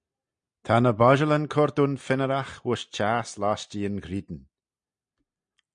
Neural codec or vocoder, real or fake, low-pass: none; real; 9.9 kHz